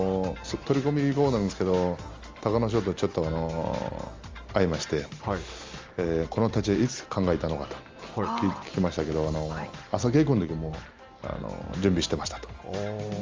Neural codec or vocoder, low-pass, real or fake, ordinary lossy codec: none; 7.2 kHz; real; Opus, 32 kbps